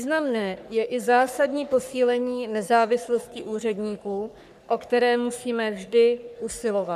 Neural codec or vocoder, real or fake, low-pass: codec, 44.1 kHz, 3.4 kbps, Pupu-Codec; fake; 14.4 kHz